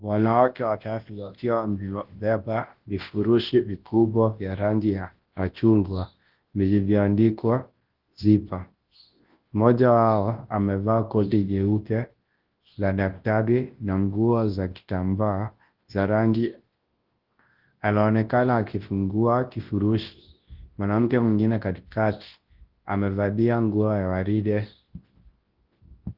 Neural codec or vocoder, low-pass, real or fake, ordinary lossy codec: codec, 24 kHz, 0.9 kbps, WavTokenizer, large speech release; 5.4 kHz; fake; Opus, 16 kbps